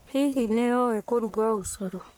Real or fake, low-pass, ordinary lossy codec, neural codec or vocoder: fake; none; none; codec, 44.1 kHz, 1.7 kbps, Pupu-Codec